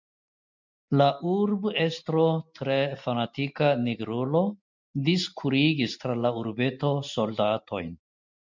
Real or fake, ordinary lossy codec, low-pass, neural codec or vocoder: real; MP3, 64 kbps; 7.2 kHz; none